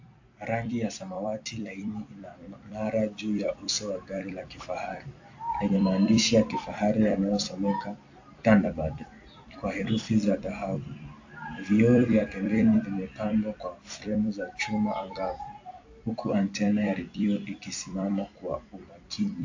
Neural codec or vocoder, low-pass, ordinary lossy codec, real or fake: vocoder, 44.1 kHz, 128 mel bands every 256 samples, BigVGAN v2; 7.2 kHz; AAC, 48 kbps; fake